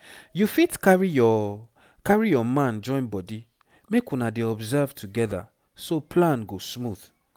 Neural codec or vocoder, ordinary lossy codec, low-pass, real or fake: none; none; 19.8 kHz; real